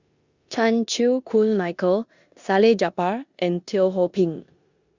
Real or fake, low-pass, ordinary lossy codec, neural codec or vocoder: fake; 7.2 kHz; Opus, 64 kbps; codec, 16 kHz in and 24 kHz out, 0.9 kbps, LongCat-Audio-Codec, four codebook decoder